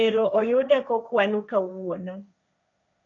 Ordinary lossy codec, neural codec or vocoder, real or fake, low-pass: MP3, 96 kbps; codec, 16 kHz, 1.1 kbps, Voila-Tokenizer; fake; 7.2 kHz